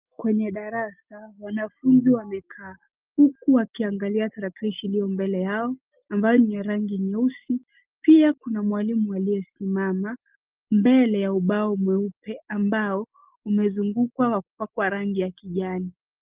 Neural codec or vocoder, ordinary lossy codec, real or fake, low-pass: none; Opus, 32 kbps; real; 3.6 kHz